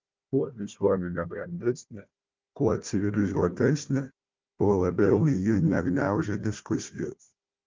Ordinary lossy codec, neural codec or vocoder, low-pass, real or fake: Opus, 24 kbps; codec, 16 kHz, 1 kbps, FunCodec, trained on Chinese and English, 50 frames a second; 7.2 kHz; fake